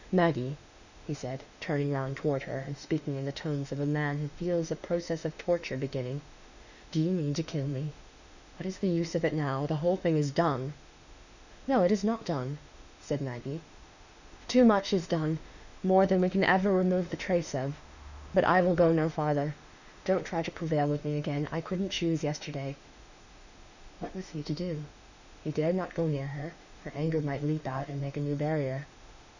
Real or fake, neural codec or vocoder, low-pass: fake; autoencoder, 48 kHz, 32 numbers a frame, DAC-VAE, trained on Japanese speech; 7.2 kHz